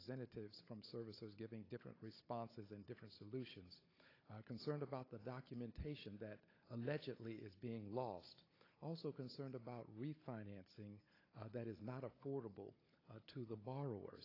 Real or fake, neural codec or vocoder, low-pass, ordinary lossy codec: fake; codec, 16 kHz, 16 kbps, FunCodec, trained on Chinese and English, 50 frames a second; 5.4 kHz; AAC, 24 kbps